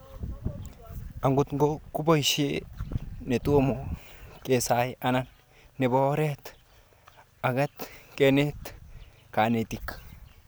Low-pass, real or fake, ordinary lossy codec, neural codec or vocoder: none; real; none; none